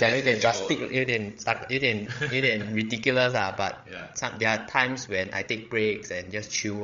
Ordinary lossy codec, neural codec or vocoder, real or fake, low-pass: MP3, 48 kbps; codec, 16 kHz, 8 kbps, FreqCodec, larger model; fake; 7.2 kHz